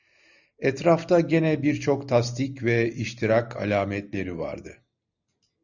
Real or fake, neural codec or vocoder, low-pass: real; none; 7.2 kHz